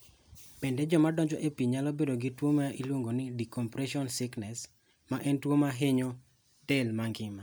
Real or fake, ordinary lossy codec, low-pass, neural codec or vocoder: real; none; none; none